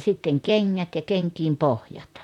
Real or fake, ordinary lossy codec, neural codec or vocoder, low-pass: fake; none; vocoder, 44.1 kHz, 128 mel bands, Pupu-Vocoder; 19.8 kHz